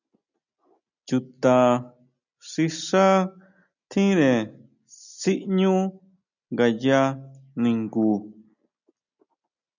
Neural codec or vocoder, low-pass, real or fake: none; 7.2 kHz; real